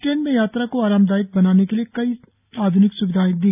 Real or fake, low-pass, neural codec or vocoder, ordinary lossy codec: real; 3.6 kHz; none; none